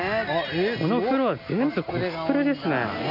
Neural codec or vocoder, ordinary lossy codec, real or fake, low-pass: none; none; real; 5.4 kHz